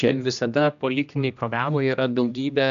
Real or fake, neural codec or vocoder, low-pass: fake; codec, 16 kHz, 1 kbps, X-Codec, HuBERT features, trained on general audio; 7.2 kHz